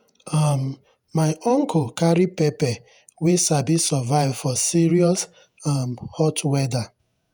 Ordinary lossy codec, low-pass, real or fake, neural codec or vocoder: none; none; fake; vocoder, 48 kHz, 128 mel bands, Vocos